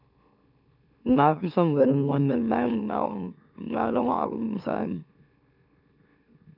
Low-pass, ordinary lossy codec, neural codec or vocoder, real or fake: 5.4 kHz; none; autoencoder, 44.1 kHz, a latent of 192 numbers a frame, MeloTTS; fake